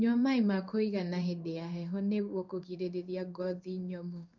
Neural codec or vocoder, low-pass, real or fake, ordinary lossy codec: codec, 16 kHz in and 24 kHz out, 1 kbps, XY-Tokenizer; 7.2 kHz; fake; none